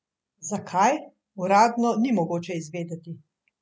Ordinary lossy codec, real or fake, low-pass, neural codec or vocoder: none; real; none; none